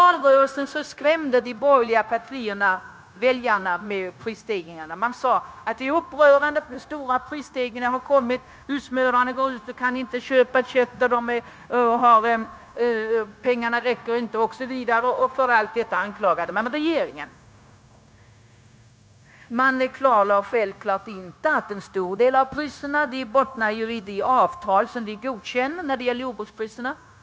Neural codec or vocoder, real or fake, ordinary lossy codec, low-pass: codec, 16 kHz, 0.9 kbps, LongCat-Audio-Codec; fake; none; none